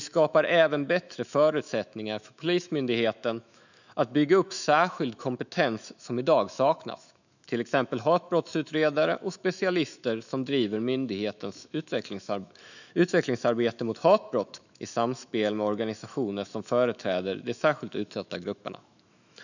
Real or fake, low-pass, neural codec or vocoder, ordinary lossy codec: fake; 7.2 kHz; autoencoder, 48 kHz, 128 numbers a frame, DAC-VAE, trained on Japanese speech; none